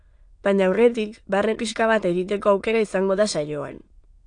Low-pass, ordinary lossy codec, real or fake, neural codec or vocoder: 9.9 kHz; AAC, 64 kbps; fake; autoencoder, 22.05 kHz, a latent of 192 numbers a frame, VITS, trained on many speakers